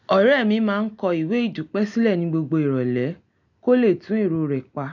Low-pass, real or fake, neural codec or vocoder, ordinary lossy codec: 7.2 kHz; real; none; none